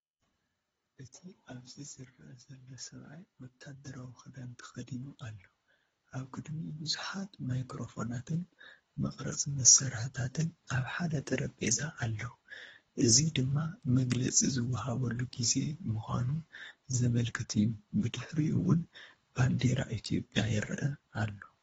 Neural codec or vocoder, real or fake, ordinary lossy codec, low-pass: codec, 24 kHz, 3 kbps, HILCodec; fake; AAC, 24 kbps; 10.8 kHz